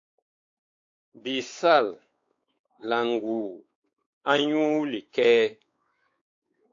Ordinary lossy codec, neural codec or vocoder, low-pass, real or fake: AAC, 48 kbps; codec, 16 kHz, 4 kbps, X-Codec, WavLM features, trained on Multilingual LibriSpeech; 7.2 kHz; fake